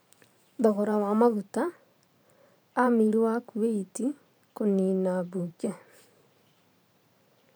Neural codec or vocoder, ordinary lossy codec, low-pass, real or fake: vocoder, 44.1 kHz, 128 mel bands every 512 samples, BigVGAN v2; none; none; fake